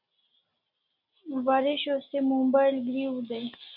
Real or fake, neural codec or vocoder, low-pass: real; none; 5.4 kHz